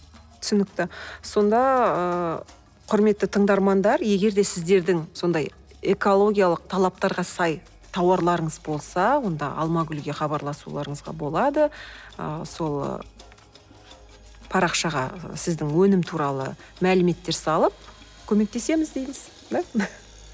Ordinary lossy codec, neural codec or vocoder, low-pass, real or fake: none; none; none; real